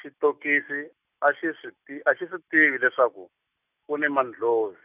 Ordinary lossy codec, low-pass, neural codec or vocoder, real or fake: none; 3.6 kHz; none; real